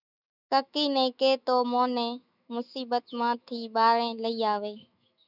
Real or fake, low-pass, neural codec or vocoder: fake; 5.4 kHz; autoencoder, 48 kHz, 128 numbers a frame, DAC-VAE, trained on Japanese speech